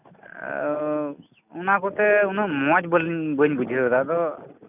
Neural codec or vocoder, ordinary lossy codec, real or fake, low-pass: none; none; real; 3.6 kHz